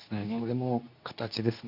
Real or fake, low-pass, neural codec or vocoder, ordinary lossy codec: fake; 5.4 kHz; codec, 24 kHz, 0.9 kbps, WavTokenizer, medium speech release version 2; none